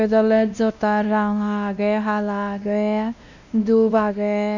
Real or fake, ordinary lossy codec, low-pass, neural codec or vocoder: fake; none; 7.2 kHz; codec, 16 kHz, 1 kbps, X-Codec, WavLM features, trained on Multilingual LibriSpeech